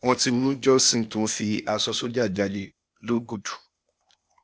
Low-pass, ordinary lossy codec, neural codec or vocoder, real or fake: none; none; codec, 16 kHz, 0.8 kbps, ZipCodec; fake